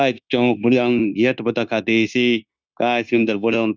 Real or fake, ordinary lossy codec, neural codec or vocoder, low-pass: fake; none; codec, 16 kHz, 0.9 kbps, LongCat-Audio-Codec; none